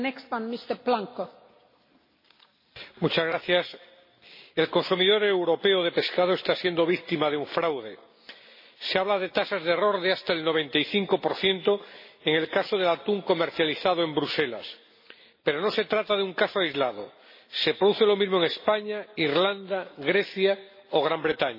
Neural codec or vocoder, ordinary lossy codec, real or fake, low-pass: none; MP3, 24 kbps; real; 5.4 kHz